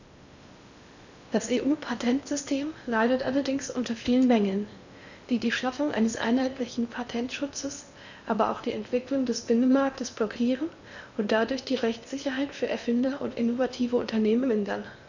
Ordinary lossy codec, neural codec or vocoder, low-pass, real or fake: none; codec, 16 kHz in and 24 kHz out, 0.8 kbps, FocalCodec, streaming, 65536 codes; 7.2 kHz; fake